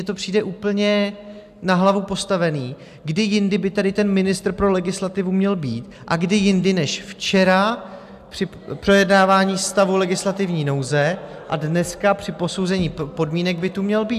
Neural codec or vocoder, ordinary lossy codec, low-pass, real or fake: none; AAC, 96 kbps; 14.4 kHz; real